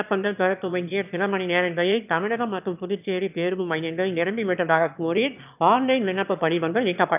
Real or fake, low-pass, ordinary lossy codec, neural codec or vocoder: fake; 3.6 kHz; none; autoencoder, 22.05 kHz, a latent of 192 numbers a frame, VITS, trained on one speaker